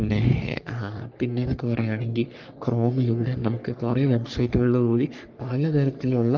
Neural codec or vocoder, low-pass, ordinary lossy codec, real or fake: codec, 44.1 kHz, 3.4 kbps, Pupu-Codec; 7.2 kHz; Opus, 32 kbps; fake